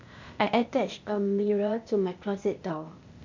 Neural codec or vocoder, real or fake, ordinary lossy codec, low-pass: codec, 16 kHz, 0.8 kbps, ZipCodec; fake; MP3, 64 kbps; 7.2 kHz